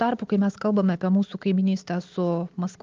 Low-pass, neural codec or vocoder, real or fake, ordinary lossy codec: 7.2 kHz; none; real; Opus, 24 kbps